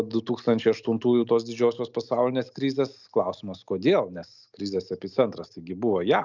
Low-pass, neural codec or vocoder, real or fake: 7.2 kHz; none; real